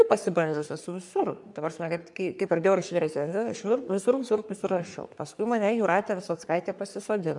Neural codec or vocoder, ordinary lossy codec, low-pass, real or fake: codec, 44.1 kHz, 3.4 kbps, Pupu-Codec; MP3, 96 kbps; 10.8 kHz; fake